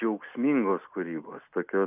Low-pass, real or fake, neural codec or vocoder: 3.6 kHz; real; none